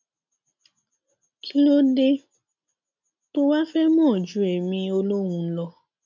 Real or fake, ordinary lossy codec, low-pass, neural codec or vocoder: real; none; 7.2 kHz; none